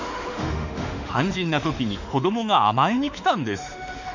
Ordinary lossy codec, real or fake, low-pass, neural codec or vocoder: none; fake; 7.2 kHz; autoencoder, 48 kHz, 32 numbers a frame, DAC-VAE, trained on Japanese speech